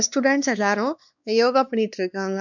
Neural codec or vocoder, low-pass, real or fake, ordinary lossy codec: codec, 16 kHz, 2 kbps, X-Codec, WavLM features, trained on Multilingual LibriSpeech; 7.2 kHz; fake; none